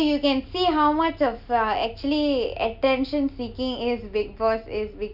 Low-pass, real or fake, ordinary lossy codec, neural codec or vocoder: 5.4 kHz; real; none; none